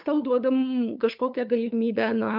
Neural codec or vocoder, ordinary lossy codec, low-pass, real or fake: codec, 16 kHz, 8 kbps, FunCodec, trained on LibriTTS, 25 frames a second; MP3, 48 kbps; 5.4 kHz; fake